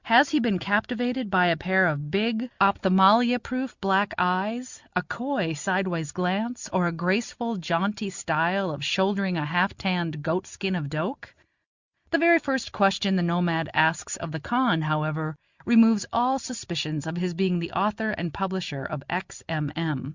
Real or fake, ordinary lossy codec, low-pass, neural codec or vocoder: real; Opus, 64 kbps; 7.2 kHz; none